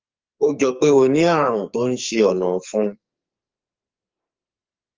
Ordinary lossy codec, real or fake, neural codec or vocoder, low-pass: Opus, 32 kbps; fake; codec, 44.1 kHz, 2.6 kbps, SNAC; 7.2 kHz